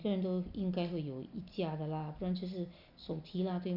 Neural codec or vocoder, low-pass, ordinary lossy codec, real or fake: none; 5.4 kHz; none; real